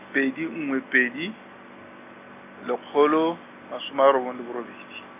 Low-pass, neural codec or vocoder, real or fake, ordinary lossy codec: 3.6 kHz; none; real; none